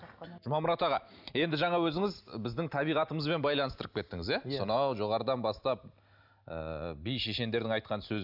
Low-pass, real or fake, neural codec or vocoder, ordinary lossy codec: 5.4 kHz; real; none; none